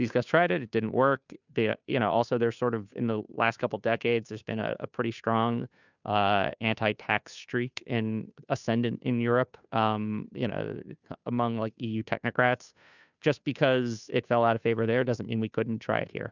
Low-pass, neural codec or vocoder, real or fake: 7.2 kHz; codec, 16 kHz, 2 kbps, FunCodec, trained on Chinese and English, 25 frames a second; fake